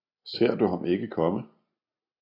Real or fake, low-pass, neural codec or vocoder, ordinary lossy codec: real; 5.4 kHz; none; AAC, 24 kbps